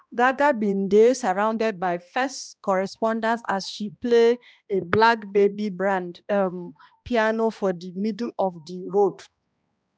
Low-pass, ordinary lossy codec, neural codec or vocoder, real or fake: none; none; codec, 16 kHz, 1 kbps, X-Codec, HuBERT features, trained on balanced general audio; fake